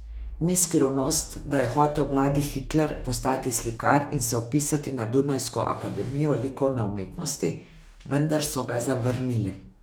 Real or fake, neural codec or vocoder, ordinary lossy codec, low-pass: fake; codec, 44.1 kHz, 2.6 kbps, DAC; none; none